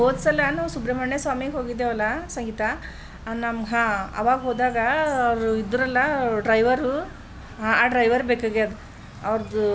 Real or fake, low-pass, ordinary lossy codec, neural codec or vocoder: real; none; none; none